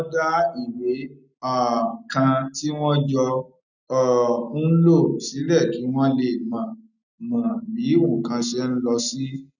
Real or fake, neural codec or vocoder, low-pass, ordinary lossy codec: real; none; 7.2 kHz; none